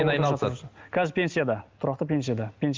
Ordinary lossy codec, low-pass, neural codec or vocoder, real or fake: Opus, 32 kbps; 7.2 kHz; none; real